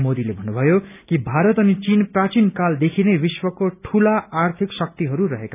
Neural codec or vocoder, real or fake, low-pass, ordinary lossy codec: none; real; 3.6 kHz; none